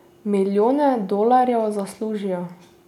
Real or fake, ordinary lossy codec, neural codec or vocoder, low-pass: real; none; none; 19.8 kHz